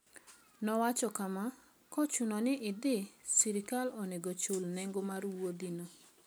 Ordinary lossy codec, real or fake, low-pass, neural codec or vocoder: none; real; none; none